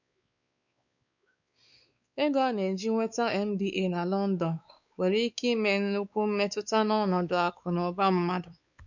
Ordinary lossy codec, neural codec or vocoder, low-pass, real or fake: none; codec, 16 kHz, 4 kbps, X-Codec, WavLM features, trained on Multilingual LibriSpeech; 7.2 kHz; fake